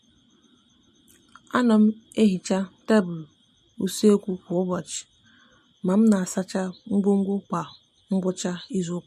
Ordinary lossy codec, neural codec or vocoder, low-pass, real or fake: MP3, 64 kbps; none; 14.4 kHz; real